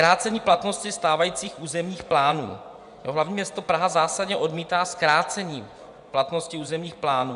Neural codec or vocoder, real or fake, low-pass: vocoder, 24 kHz, 100 mel bands, Vocos; fake; 10.8 kHz